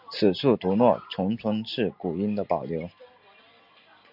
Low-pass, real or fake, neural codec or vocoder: 5.4 kHz; real; none